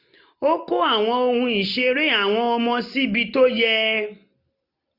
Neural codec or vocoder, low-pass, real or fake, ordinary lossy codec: none; 5.4 kHz; real; none